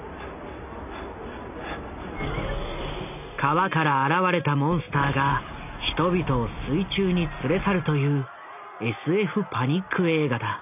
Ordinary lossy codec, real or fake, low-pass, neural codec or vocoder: none; real; 3.6 kHz; none